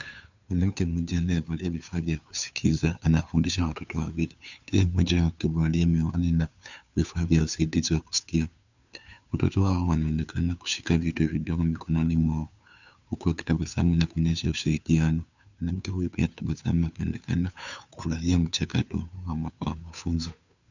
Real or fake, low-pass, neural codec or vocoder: fake; 7.2 kHz; codec, 16 kHz, 2 kbps, FunCodec, trained on Chinese and English, 25 frames a second